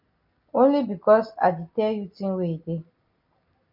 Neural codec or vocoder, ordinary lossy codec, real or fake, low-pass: none; MP3, 48 kbps; real; 5.4 kHz